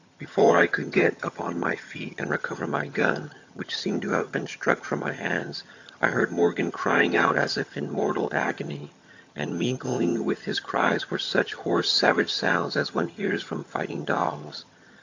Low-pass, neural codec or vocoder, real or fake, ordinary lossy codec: 7.2 kHz; vocoder, 22.05 kHz, 80 mel bands, HiFi-GAN; fake; AAC, 48 kbps